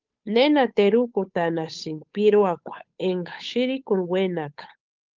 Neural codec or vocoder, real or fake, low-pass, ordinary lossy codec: codec, 16 kHz, 8 kbps, FunCodec, trained on Chinese and English, 25 frames a second; fake; 7.2 kHz; Opus, 32 kbps